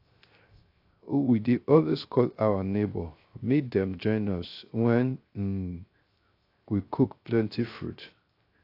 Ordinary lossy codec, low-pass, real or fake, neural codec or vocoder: AAC, 32 kbps; 5.4 kHz; fake; codec, 16 kHz, 0.3 kbps, FocalCodec